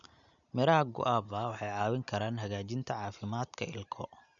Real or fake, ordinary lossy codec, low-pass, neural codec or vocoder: real; none; 7.2 kHz; none